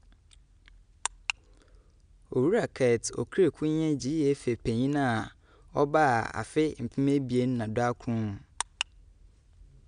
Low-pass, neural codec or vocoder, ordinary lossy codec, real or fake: 9.9 kHz; none; none; real